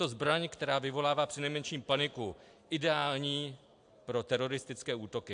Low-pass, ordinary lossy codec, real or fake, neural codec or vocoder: 9.9 kHz; AAC, 64 kbps; real; none